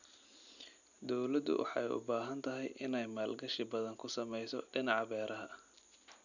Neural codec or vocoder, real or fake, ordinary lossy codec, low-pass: none; real; none; 7.2 kHz